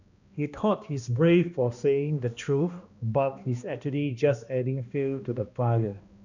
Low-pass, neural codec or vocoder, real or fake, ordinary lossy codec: 7.2 kHz; codec, 16 kHz, 1 kbps, X-Codec, HuBERT features, trained on balanced general audio; fake; none